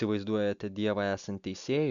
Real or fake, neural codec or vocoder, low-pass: real; none; 7.2 kHz